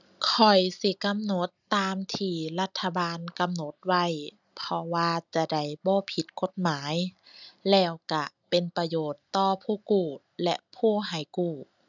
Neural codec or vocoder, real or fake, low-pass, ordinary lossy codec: none; real; 7.2 kHz; none